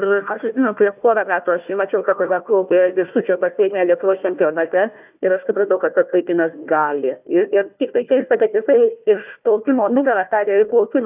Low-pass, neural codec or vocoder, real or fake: 3.6 kHz; codec, 16 kHz, 1 kbps, FunCodec, trained on Chinese and English, 50 frames a second; fake